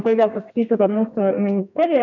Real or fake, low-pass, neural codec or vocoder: fake; 7.2 kHz; codec, 32 kHz, 1.9 kbps, SNAC